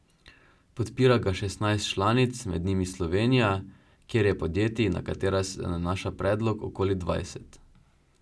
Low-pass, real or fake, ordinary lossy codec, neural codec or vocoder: none; real; none; none